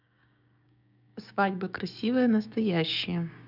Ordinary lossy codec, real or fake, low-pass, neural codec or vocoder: none; fake; 5.4 kHz; codec, 16 kHz, 6 kbps, DAC